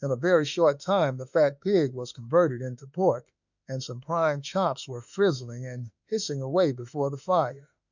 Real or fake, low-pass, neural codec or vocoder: fake; 7.2 kHz; autoencoder, 48 kHz, 32 numbers a frame, DAC-VAE, trained on Japanese speech